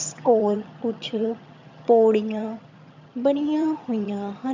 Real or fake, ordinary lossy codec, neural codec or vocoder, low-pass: fake; MP3, 64 kbps; vocoder, 22.05 kHz, 80 mel bands, HiFi-GAN; 7.2 kHz